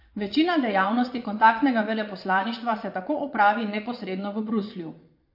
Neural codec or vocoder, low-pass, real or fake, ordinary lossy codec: vocoder, 22.05 kHz, 80 mel bands, Vocos; 5.4 kHz; fake; MP3, 32 kbps